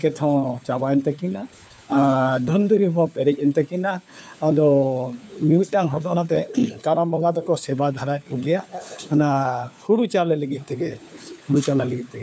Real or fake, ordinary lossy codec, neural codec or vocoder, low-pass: fake; none; codec, 16 kHz, 4 kbps, FunCodec, trained on LibriTTS, 50 frames a second; none